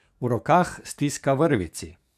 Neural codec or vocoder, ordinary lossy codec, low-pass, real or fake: codec, 44.1 kHz, 7.8 kbps, DAC; none; 14.4 kHz; fake